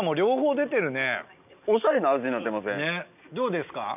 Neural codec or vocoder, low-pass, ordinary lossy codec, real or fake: none; 3.6 kHz; none; real